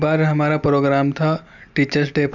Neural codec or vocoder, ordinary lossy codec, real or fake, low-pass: none; none; real; 7.2 kHz